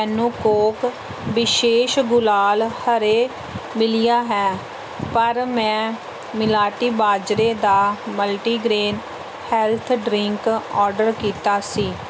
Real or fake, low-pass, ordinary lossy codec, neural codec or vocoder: real; none; none; none